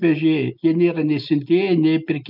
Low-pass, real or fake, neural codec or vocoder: 5.4 kHz; real; none